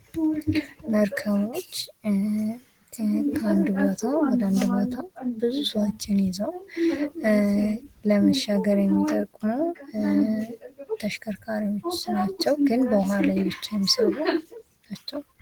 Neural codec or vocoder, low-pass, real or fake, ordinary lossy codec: none; 19.8 kHz; real; Opus, 16 kbps